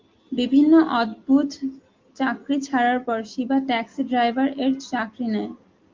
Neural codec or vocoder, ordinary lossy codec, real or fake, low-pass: none; Opus, 32 kbps; real; 7.2 kHz